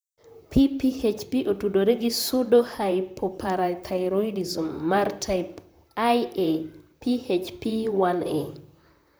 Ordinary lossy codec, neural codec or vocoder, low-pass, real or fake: none; vocoder, 44.1 kHz, 128 mel bands, Pupu-Vocoder; none; fake